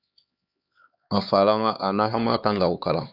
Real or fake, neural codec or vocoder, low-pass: fake; codec, 16 kHz, 4 kbps, X-Codec, HuBERT features, trained on LibriSpeech; 5.4 kHz